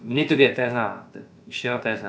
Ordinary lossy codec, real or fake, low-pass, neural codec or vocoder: none; fake; none; codec, 16 kHz, about 1 kbps, DyCAST, with the encoder's durations